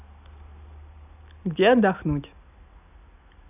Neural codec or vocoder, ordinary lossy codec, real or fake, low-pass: none; none; real; 3.6 kHz